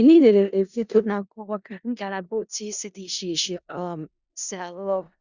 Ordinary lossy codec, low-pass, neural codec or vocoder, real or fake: Opus, 64 kbps; 7.2 kHz; codec, 16 kHz in and 24 kHz out, 0.4 kbps, LongCat-Audio-Codec, four codebook decoder; fake